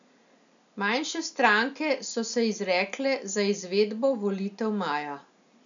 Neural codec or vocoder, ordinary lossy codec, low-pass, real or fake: none; none; 7.2 kHz; real